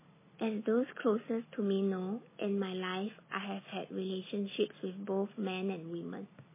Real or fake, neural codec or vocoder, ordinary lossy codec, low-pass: real; none; MP3, 16 kbps; 3.6 kHz